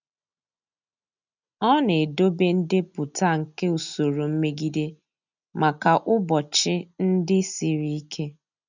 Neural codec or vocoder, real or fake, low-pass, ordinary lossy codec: none; real; 7.2 kHz; none